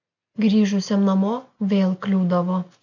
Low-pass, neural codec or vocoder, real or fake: 7.2 kHz; none; real